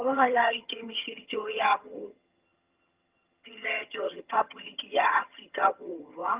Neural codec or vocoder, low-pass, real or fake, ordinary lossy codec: vocoder, 22.05 kHz, 80 mel bands, HiFi-GAN; 3.6 kHz; fake; Opus, 32 kbps